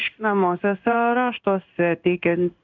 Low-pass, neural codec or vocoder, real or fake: 7.2 kHz; codec, 16 kHz in and 24 kHz out, 1 kbps, XY-Tokenizer; fake